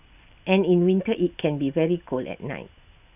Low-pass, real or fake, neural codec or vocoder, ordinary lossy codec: 3.6 kHz; real; none; none